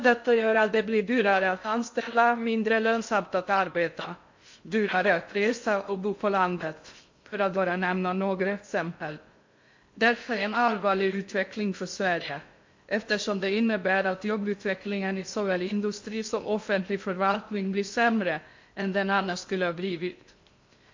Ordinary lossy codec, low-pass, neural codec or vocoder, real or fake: MP3, 48 kbps; 7.2 kHz; codec, 16 kHz in and 24 kHz out, 0.6 kbps, FocalCodec, streaming, 2048 codes; fake